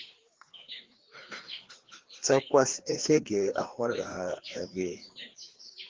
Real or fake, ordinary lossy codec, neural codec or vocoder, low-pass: fake; Opus, 16 kbps; codec, 16 kHz, 2 kbps, FreqCodec, larger model; 7.2 kHz